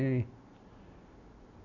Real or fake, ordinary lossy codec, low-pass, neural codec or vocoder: real; none; 7.2 kHz; none